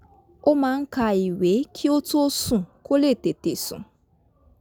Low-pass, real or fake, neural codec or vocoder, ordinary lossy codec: none; real; none; none